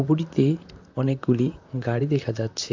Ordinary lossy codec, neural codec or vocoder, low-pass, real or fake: AAC, 48 kbps; vocoder, 44.1 kHz, 128 mel bands, Pupu-Vocoder; 7.2 kHz; fake